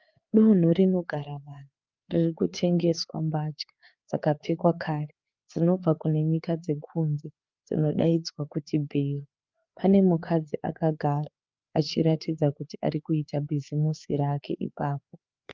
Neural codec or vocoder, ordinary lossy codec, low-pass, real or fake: codec, 16 kHz, 4 kbps, FreqCodec, larger model; Opus, 24 kbps; 7.2 kHz; fake